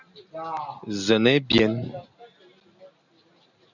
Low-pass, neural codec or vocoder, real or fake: 7.2 kHz; none; real